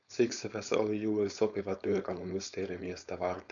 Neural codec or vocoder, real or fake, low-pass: codec, 16 kHz, 4.8 kbps, FACodec; fake; 7.2 kHz